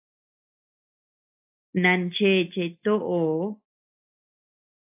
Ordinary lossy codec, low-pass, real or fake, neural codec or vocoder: AAC, 32 kbps; 3.6 kHz; real; none